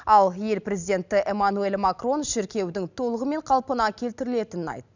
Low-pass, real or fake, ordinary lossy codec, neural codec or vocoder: 7.2 kHz; real; none; none